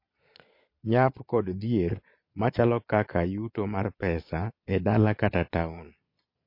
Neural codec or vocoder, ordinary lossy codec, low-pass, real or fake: vocoder, 22.05 kHz, 80 mel bands, Vocos; MP3, 32 kbps; 5.4 kHz; fake